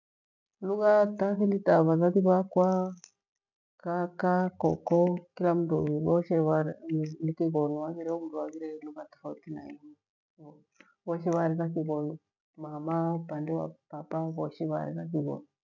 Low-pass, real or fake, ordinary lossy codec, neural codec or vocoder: 7.2 kHz; real; none; none